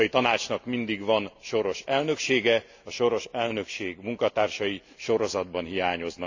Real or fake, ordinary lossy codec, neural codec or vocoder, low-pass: real; AAC, 48 kbps; none; 7.2 kHz